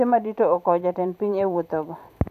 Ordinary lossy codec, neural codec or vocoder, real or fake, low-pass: none; vocoder, 44.1 kHz, 128 mel bands every 512 samples, BigVGAN v2; fake; 14.4 kHz